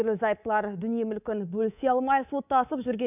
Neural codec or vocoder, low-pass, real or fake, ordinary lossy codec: codec, 24 kHz, 3.1 kbps, DualCodec; 3.6 kHz; fake; none